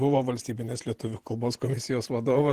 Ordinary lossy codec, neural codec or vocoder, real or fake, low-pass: Opus, 16 kbps; vocoder, 48 kHz, 128 mel bands, Vocos; fake; 14.4 kHz